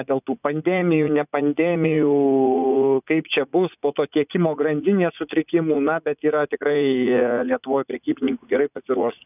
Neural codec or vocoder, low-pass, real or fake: vocoder, 44.1 kHz, 80 mel bands, Vocos; 3.6 kHz; fake